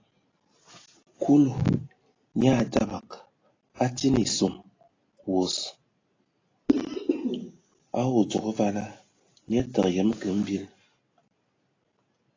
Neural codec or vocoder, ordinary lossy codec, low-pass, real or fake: none; AAC, 32 kbps; 7.2 kHz; real